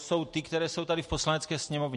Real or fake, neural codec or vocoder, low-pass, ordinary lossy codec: real; none; 14.4 kHz; MP3, 48 kbps